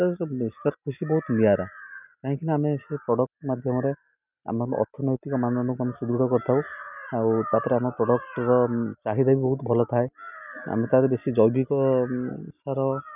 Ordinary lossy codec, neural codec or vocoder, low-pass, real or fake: none; none; 3.6 kHz; real